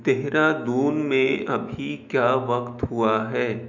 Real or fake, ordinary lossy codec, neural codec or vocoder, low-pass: fake; none; autoencoder, 48 kHz, 128 numbers a frame, DAC-VAE, trained on Japanese speech; 7.2 kHz